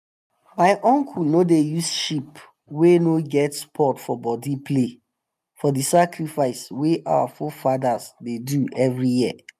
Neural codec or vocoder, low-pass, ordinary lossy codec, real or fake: none; 14.4 kHz; none; real